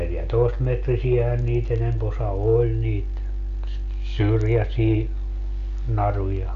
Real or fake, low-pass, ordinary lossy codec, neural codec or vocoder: real; 7.2 kHz; none; none